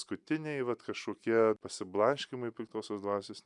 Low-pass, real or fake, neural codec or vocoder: 10.8 kHz; real; none